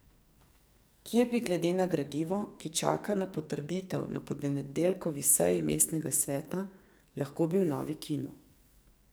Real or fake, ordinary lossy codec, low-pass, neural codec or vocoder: fake; none; none; codec, 44.1 kHz, 2.6 kbps, SNAC